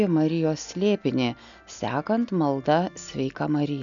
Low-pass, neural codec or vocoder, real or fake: 7.2 kHz; none; real